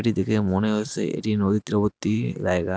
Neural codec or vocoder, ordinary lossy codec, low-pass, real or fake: none; none; none; real